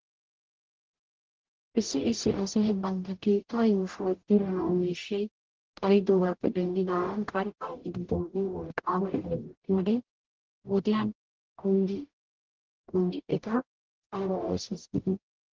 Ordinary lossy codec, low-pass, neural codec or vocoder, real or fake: Opus, 16 kbps; 7.2 kHz; codec, 44.1 kHz, 0.9 kbps, DAC; fake